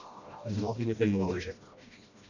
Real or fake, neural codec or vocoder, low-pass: fake; codec, 16 kHz, 1 kbps, FreqCodec, smaller model; 7.2 kHz